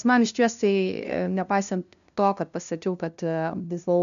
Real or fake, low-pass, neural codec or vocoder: fake; 7.2 kHz; codec, 16 kHz, 0.5 kbps, FunCodec, trained on LibriTTS, 25 frames a second